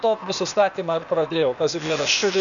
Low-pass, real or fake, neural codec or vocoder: 7.2 kHz; fake; codec, 16 kHz, 0.8 kbps, ZipCodec